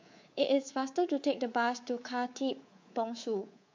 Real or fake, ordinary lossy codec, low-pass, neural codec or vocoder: fake; MP3, 48 kbps; 7.2 kHz; codec, 24 kHz, 3.1 kbps, DualCodec